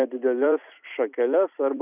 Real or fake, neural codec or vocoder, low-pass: fake; vocoder, 44.1 kHz, 128 mel bands every 256 samples, BigVGAN v2; 3.6 kHz